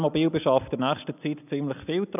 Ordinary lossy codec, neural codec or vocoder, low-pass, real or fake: none; none; 3.6 kHz; real